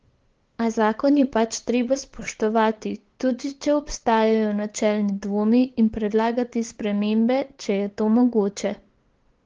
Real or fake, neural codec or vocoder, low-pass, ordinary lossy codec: fake; codec, 16 kHz, 8 kbps, FunCodec, trained on LibriTTS, 25 frames a second; 7.2 kHz; Opus, 16 kbps